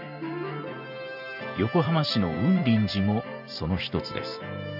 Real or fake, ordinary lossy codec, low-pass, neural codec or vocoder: fake; none; 5.4 kHz; vocoder, 44.1 kHz, 128 mel bands every 512 samples, BigVGAN v2